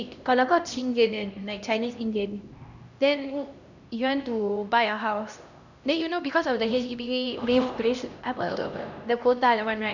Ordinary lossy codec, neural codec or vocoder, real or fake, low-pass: none; codec, 16 kHz, 1 kbps, X-Codec, HuBERT features, trained on LibriSpeech; fake; 7.2 kHz